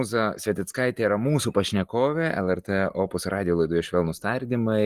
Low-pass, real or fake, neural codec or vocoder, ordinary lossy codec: 14.4 kHz; real; none; Opus, 24 kbps